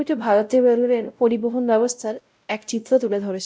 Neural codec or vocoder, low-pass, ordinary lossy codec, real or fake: codec, 16 kHz, 0.5 kbps, X-Codec, WavLM features, trained on Multilingual LibriSpeech; none; none; fake